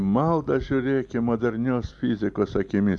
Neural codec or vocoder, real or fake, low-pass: none; real; 10.8 kHz